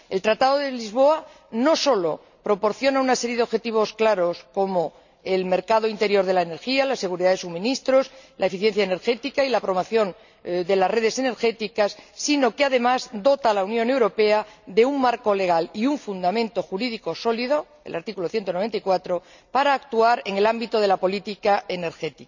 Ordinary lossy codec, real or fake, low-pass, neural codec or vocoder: none; real; 7.2 kHz; none